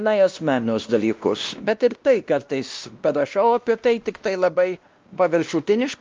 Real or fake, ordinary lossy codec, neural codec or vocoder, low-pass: fake; Opus, 32 kbps; codec, 16 kHz, 1 kbps, X-Codec, WavLM features, trained on Multilingual LibriSpeech; 7.2 kHz